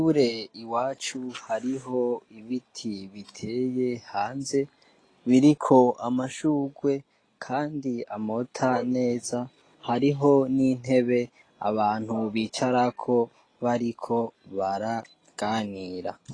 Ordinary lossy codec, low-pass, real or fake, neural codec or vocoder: AAC, 32 kbps; 9.9 kHz; real; none